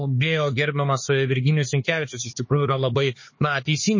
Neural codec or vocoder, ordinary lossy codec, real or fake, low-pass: codec, 16 kHz, 4 kbps, X-Codec, HuBERT features, trained on LibriSpeech; MP3, 32 kbps; fake; 7.2 kHz